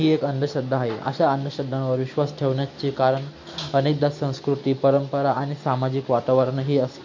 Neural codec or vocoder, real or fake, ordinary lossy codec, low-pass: none; real; MP3, 48 kbps; 7.2 kHz